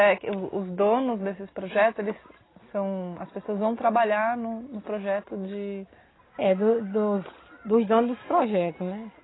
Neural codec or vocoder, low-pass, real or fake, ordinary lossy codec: none; 7.2 kHz; real; AAC, 16 kbps